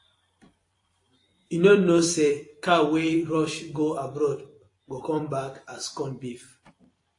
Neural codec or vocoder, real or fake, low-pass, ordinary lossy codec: vocoder, 44.1 kHz, 128 mel bands every 256 samples, BigVGAN v2; fake; 10.8 kHz; AAC, 48 kbps